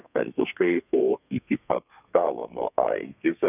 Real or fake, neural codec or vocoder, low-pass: fake; codec, 32 kHz, 1.9 kbps, SNAC; 3.6 kHz